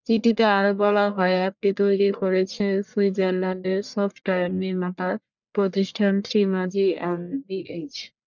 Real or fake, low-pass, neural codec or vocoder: fake; 7.2 kHz; codec, 44.1 kHz, 1.7 kbps, Pupu-Codec